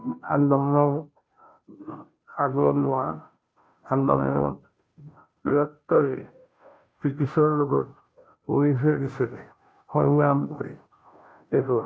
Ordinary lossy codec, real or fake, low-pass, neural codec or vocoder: none; fake; none; codec, 16 kHz, 0.5 kbps, FunCodec, trained on Chinese and English, 25 frames a second